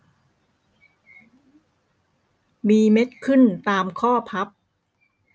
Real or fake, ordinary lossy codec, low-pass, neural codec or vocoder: real; none; none; none